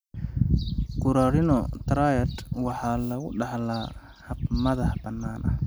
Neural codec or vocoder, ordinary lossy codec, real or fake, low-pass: none; none; real; none